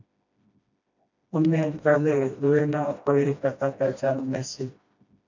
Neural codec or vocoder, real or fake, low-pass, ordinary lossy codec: codec, 16 kHz, 1 kbps, FreqCodec, smaller model; fake; 7.2 kHz; MP3, 64 kbps